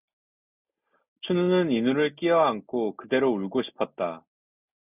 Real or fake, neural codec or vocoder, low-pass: real; none; 3.6 kHz